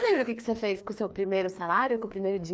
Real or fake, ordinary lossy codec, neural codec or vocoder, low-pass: fake; none; codec, 16 kHz, 2 kbps, FreqCodec, larger model; none